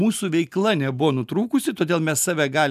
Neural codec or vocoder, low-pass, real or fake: none; 14.4 kHz; real